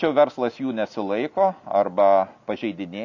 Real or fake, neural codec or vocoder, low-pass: real; none; 7.2 kHz